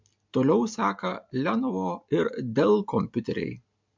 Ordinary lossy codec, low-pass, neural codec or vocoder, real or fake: MP3, 64 kbps; 7.2 kHz; none; real